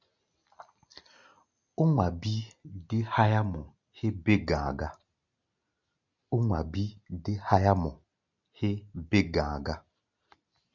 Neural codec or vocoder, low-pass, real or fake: none; 7.2 kHz; real